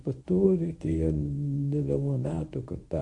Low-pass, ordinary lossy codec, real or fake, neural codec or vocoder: 10.8 kHz; MP3, 48 kbps; fake; codec, 24 kHz, 0.9 kbps, WavTokenizer, medium speech release version 1